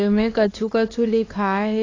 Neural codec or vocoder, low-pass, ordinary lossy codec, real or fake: codec, 16 kHz, 2 kbps, X-Codec, HuBERT features, trained on LibriSpeech; 7.2 kHz; AAC, 32 kbps; fake